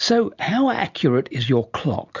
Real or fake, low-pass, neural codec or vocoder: real; 7.2 kHz; none